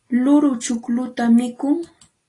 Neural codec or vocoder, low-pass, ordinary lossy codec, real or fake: none; 10.8 kHz; AAC, 32 kbps; real